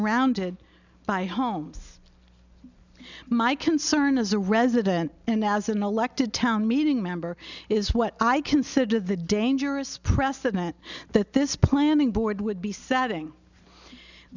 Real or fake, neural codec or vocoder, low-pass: real; none; 7.2 kHz